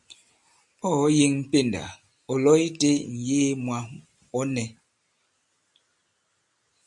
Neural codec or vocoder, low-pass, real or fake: none; 10.8 kHz; real